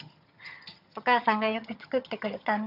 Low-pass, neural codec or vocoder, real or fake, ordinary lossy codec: 5.4 kHz; vocoder, 22.05 kHz, 80 mel bands, HiFi-GAN; fake; none